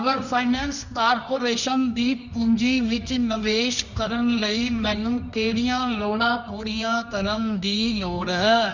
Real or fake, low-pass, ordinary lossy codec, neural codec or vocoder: fake; 7.2 kHz; none; codec, 24 kHz, 0.9 kbps, WavTokenizer, medium music audio release